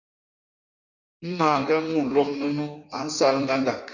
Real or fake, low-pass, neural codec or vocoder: fake; 7.2 kHz; codec, 16 kHz in and 24 kHz out, 1.1 kbps, FireRedTTS-2 codec